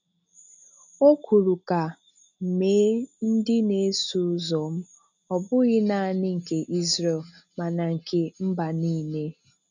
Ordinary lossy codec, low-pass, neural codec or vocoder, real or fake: none; 7.2 kHz; none; real